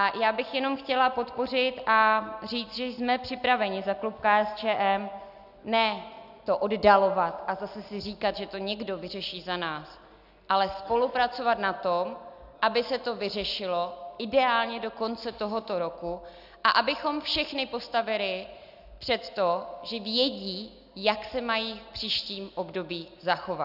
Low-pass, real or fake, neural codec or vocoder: 5.4 kHz; real; none